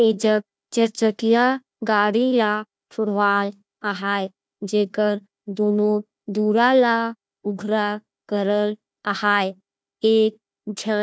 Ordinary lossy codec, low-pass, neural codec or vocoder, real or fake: none; none; codec, 16 kHz, 1 kbps, FunCodec, trained on Chinese and English, 50 frames a second; fake